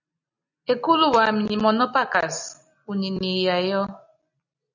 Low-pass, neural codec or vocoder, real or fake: 7.2 kHz; none; real